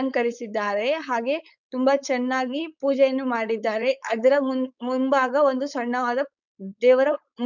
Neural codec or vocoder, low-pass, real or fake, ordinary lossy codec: codec, 16 kHz, 4.8 kbps, FACodec; 7.2 kHz; fake; none